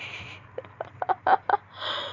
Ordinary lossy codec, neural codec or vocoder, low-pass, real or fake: none; none; 7.2 kHz; real